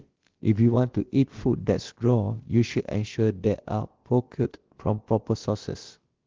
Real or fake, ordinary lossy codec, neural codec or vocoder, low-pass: fake; Opus, 16 kbps; codec, 16 kHz, about 1 kbps, DyCAST, with the encoder's durations; 7.2 kHz